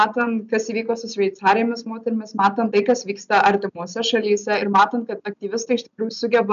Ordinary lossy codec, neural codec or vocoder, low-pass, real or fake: MP3, 96 kbps; none; 7.2 kHz; real